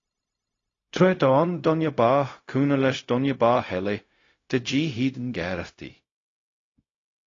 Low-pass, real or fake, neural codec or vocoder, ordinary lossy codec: 7.2 kHz; fake; codec, 16 kHz, 0.4 kbps, LongCat-Audio-Codec; AAC, 32 kbps